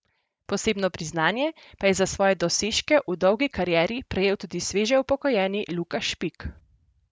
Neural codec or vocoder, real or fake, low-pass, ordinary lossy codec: none; real; none; none